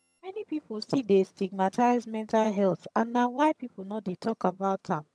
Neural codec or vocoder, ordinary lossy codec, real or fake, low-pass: vocoder, 22.05 kHz, 80 mel bands, HiFi-GAN; none; fake; none